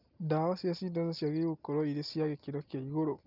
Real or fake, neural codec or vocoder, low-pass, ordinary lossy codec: real; none; 5.4 kHz; Opus, 24 kbps